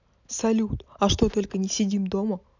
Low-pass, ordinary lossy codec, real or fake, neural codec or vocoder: 7.2 kHz; none; real; none